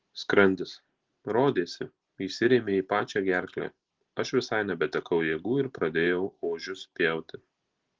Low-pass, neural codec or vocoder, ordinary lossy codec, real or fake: 7.2 kHz; none; Opus, 16 kbps; real